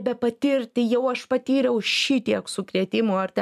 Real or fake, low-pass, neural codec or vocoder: real; 14.4 kHz; none